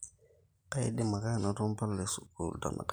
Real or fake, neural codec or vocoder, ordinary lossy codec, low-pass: real; none; none; none